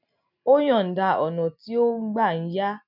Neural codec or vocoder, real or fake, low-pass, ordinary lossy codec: none; real; 5.4 kHz; none